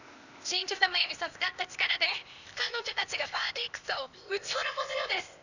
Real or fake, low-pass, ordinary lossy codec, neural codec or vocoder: fake; 7.2 kHz; none; codec, 16 kHz, 0.8 kbps, ZipCodec